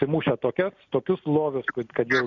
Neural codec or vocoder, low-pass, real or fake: none; 7.2 kHz; real